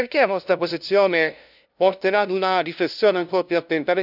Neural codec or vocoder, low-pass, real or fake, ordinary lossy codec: codec, 16 kHz, 0.5 kbps, FunCodec, trained on LibriTTS, 25 frames a second; 5.4 kHz; fake; none